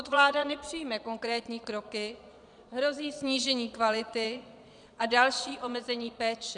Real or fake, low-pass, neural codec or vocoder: fake; 9.9 kHz; vocoder, 22.05 kHz, 80 mel bands, Vocos